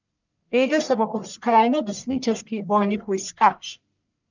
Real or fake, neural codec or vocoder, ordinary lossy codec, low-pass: fake; codec, 44.1 kHz, 1.7 kbps, Pupu-Codec; none; 7.2 kHz